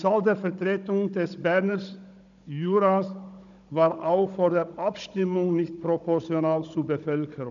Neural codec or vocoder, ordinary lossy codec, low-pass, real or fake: codec, 16 kHz, 16 kbps, FunCodec, trained on Chinese and English, 50 frames a second; none; 7.2 kHz; fake